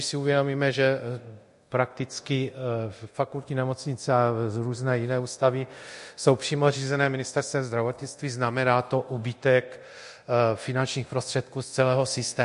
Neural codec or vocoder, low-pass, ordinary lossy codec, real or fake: codec, 24 kHz, 0.9 kbps, DualCodec; 10.8 kHz; MP3, 48 kbps; fake